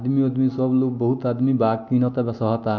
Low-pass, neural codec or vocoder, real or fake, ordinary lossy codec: 7.2 kHz; none; real; MP3, 48 kbps